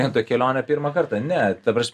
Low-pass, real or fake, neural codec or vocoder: 14.4 kHz; real; none